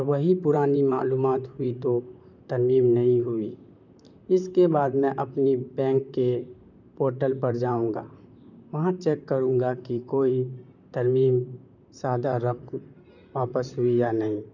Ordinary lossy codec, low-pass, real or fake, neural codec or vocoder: none; 7.2 kHz; fake; vocoder, 44.1 kHz, 128 mel bands, Pupu-Vocoder